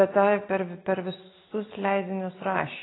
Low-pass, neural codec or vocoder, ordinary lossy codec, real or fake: 7.2 kHz; none; AAC, 16 kbps; real